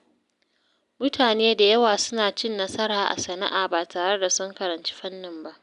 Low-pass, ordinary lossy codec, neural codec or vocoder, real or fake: 10.8 kHz; none; none; real